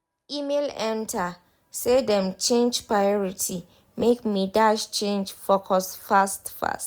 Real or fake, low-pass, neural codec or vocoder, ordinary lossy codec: real; none; none; none